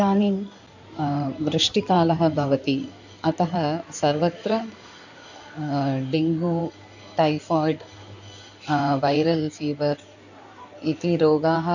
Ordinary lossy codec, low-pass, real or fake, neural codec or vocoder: none; 7.2 kHz; fake; codec, 16 kHz in and 24 kHz out, 2.2 kbps, FireRedTTS-2 codec